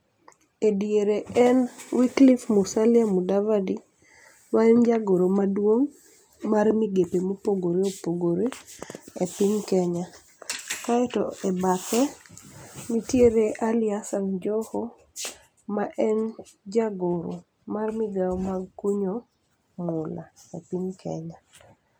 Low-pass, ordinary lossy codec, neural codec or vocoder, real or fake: none; none; none; real